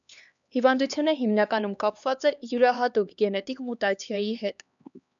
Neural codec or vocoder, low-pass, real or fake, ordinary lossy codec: codec, 16 kHz, 2 kbps, X-Codec, HuBERT features, trained on LibriSpeech; 7.2 kHz; fake; MP3, 96 kbps